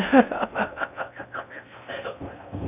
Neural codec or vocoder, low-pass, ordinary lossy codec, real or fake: codec, 16 kHz in and 24 kHz out, 0.6 kbps, FocalCodec, streaming, 2048 codes; 3.6 kHz; none; fake